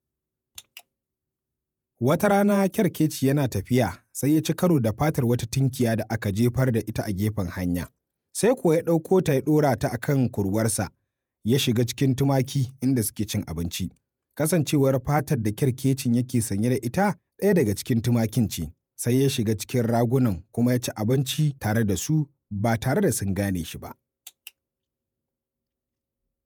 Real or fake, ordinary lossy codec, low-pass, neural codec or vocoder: fake; none; none; vocoder, 48 kHz, 128 mel bands, Vocos